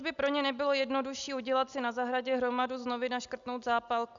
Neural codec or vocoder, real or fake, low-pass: none; real; 7.2 kHz